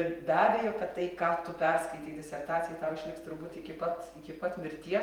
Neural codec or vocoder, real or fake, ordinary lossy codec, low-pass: vocoder, 48 kHz, 128 mel bands, Vocos; fake; Opus, 32 kbps; 19.8 kHz